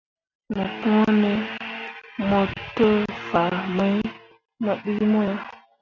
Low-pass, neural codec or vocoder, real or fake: 7.2 kHz; none; real